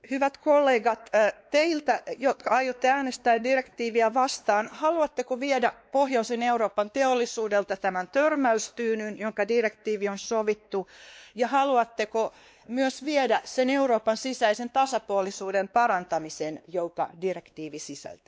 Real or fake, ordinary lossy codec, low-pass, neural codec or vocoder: fake; none; none; codec, 16 kHz, 2 kbps, X-Codec, WavLM features, trained on Multilingual LibriSpeech